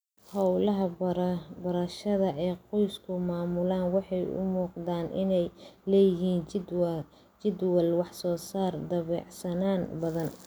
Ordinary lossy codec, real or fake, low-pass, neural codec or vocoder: none; real; none; none